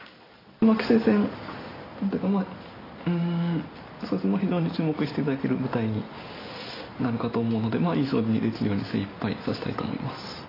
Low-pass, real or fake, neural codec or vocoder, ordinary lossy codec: 5.4 kHz; real; none; AAC, 24 kbps